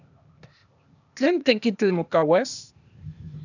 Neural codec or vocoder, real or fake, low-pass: codec, 16 kHz, 0.8 kbps, ZipCodec; fake; 7.2 kHz